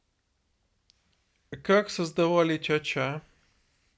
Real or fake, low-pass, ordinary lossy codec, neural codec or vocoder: real; none; none; none